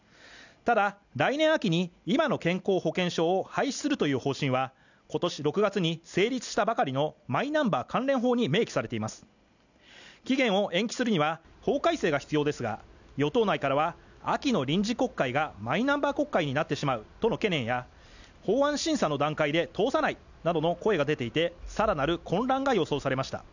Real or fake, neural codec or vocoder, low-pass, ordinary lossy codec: real; none; 7.2 kHz; none